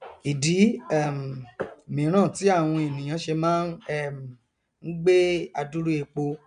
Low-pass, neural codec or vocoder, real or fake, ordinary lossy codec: 9.9 kHz; none; real; MP3, 96 kbps